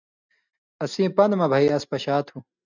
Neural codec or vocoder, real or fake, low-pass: none; real; 7.2 kHz